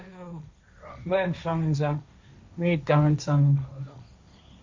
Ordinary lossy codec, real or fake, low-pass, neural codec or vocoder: MP3, 64 kbps; fake; 7.2 kHz; codec, 16 kHz, 1.1 kbps, Voila-Tokenizer